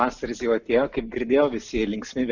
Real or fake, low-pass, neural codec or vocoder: real; 7.2 kHz; none